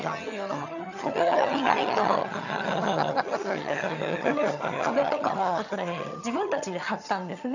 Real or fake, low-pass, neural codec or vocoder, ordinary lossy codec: fake; 7.2 kHz; vocoder, 22.05 kHz, 80 mel bands, HiFi-GAN; none